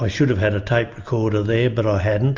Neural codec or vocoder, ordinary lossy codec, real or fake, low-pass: none; MP3, 64 kbps; real; 7.2 kHz